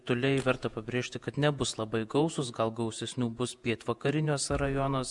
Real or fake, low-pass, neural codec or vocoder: fake; 10.8 kHz; vocoder, 48 kHz, 128 mel bands, Vocos